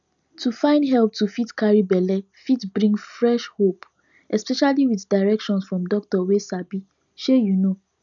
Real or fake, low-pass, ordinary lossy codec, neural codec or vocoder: real; 7.2 kHz; none; none